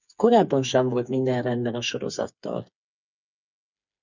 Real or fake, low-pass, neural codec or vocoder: fake; 7.2 kHz; codec, 16 kHz, 4 kbps, FreqCodec, smaller model